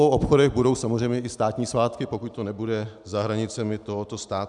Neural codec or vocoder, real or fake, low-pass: none; real; 10.8 kHz